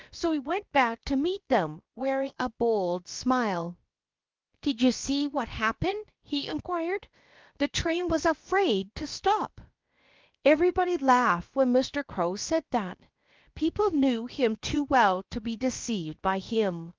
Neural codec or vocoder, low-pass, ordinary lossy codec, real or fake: codec, 16 kHz, about 1 kbps, DyCAST, with the encoder's durations; 7.2 kHz; Opus, 32 kbps; fake